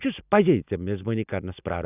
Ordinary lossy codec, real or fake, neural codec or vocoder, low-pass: none; real; none; 3.6 kHz